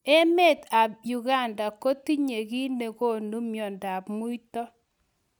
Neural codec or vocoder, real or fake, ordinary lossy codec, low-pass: none; real; none; none